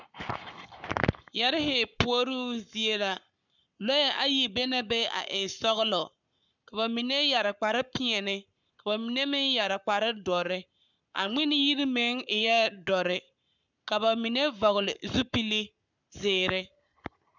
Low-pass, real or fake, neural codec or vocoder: 7.2 kHz; fake; codec, 44.1 kHz, 7.8 kbps, Pupu-Codec